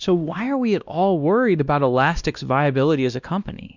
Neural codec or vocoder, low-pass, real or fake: codec, 16 kHz, 1 kbps, X-Codec, WavLM features, trained on Multilingual LibriSpeech; 7.2 kHz; fake